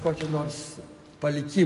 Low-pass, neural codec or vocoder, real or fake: 10.8 kHz; none; real